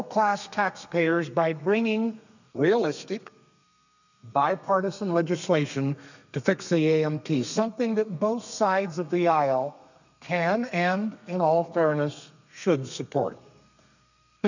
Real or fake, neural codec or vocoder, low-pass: fake; codec, 32 kHz, 1.9 kbps, SNAC; 7.2 kHz